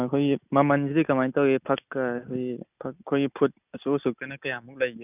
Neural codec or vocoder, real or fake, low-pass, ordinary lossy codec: none; real; 3.6 kHz; none